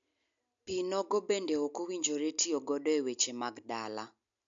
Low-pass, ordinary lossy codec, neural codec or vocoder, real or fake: 7.2 kHz; AAC, 64 kbps; none; real